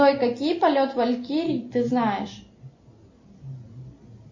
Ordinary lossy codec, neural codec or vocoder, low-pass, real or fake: MP3, 32 kbps; none; 7.2 kHz; real